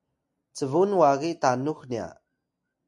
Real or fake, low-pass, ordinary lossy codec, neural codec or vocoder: real; 10.8 kHz; MP3, 48 kbps; none